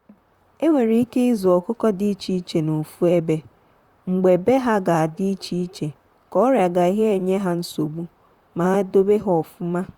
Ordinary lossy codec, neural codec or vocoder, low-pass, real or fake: Opus, 64 kbps; vocoder, 44.1 kHz, 128 mel bands, Pupu-Vocoder; 19.8 kHz; fake